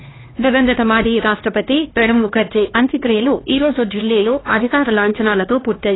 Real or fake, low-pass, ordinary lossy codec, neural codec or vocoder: fake; 7.2 kHz; AAC, 16 kbps; codec, 16 kHz, 2 kbps, X-Codec, HuBERT features, trained on LibriSpeech